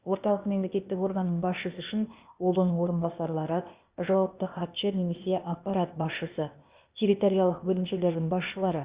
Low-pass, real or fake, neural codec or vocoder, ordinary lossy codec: 3.6 kHz; fake; codec, 16 kHz, 0.8 kbps, ZipCodec; Opus, 64 kbps